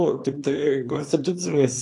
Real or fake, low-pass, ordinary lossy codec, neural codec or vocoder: fake; 10.8 kHz; AAC, 48 kbps; codec, 24 kHz, 0.9 kbps, WavTokenizer, small release